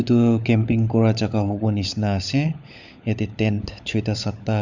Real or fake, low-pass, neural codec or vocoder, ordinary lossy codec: fake; 7.2 kHz; codec, 16 kHz, 16 kbps, FunCodec, trained on LibriTTS, 50 frames a second; none